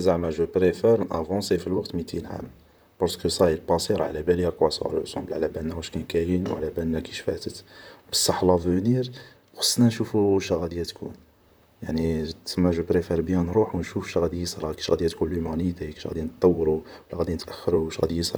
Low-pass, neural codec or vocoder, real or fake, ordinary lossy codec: none; vocoder, 44.1 kHz, 128 mel bands, Pupu-Vocoder; fake; none